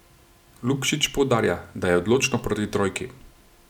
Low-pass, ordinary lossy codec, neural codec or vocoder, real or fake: 19.8 kHz; none; none; real